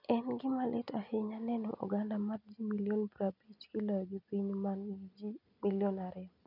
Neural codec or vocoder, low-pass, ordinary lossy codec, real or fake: none; 5.4 kHz; none; real